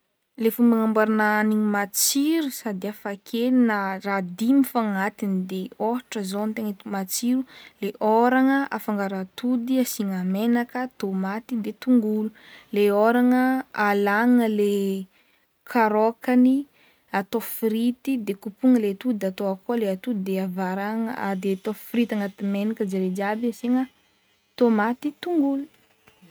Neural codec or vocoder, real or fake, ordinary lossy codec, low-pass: none; real; none; none